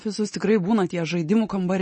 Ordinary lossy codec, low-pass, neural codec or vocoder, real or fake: MP3, 32 kbps; 10.8 kHz; none; real